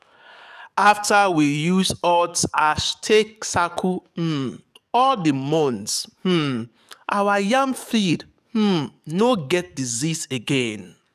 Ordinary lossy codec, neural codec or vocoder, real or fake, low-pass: none; codec, 44.1 kHz, 7.8 kbps, DAC; fake; 14.4 kHz